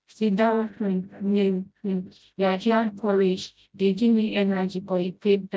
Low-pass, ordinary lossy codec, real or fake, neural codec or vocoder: none; none; fake; codec, 16 kHz, 0.5 kbps, FreqCodec, smaller model